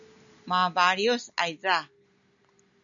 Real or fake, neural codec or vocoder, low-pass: real; none; 7.2 kHz